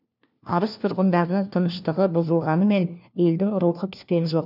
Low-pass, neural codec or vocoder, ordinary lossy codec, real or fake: 5.4 kHz; codec, 16 kHz, 1 kbps, FunCodec, trained on LibriTTS, 50 frames a second; none; fake